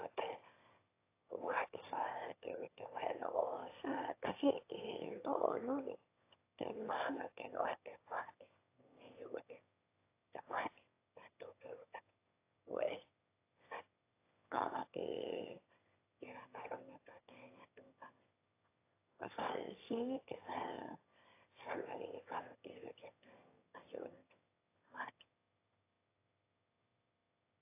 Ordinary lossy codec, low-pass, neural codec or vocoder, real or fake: AAC, 24 kbps; 3.6 kHz; autoencoder, 22.05 kHz, a latent of 192 numbers a frame, VITS, trained on one speaker; fake